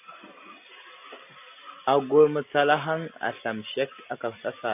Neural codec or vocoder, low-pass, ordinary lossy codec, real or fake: vocoder, 44.1 kHz, 128 mel bands every 256 samples, BigVGAN v2; 3.6 kHz; AAC, 32 kbps; fake